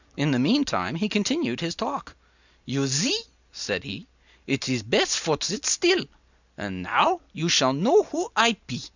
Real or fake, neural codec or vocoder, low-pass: real; none; 7.2 kHz